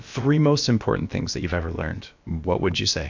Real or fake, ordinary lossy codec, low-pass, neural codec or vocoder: fake; MP3, 64 kbps; 7.2 kHz; codec, 16 kHz, about 1 kbps, DyCAST, with the encoder's durations